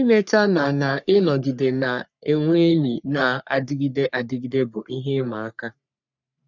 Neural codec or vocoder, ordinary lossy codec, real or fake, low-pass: codec, 44.1 kHz, 3.4 kbps, Pupu-Codec; none; fake; 7.2 kHz